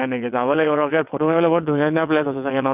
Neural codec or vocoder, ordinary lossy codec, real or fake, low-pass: vocoder, 22.05 kHz, 80 mel bands, WaveNeXt; none; fake; 3.6 kHz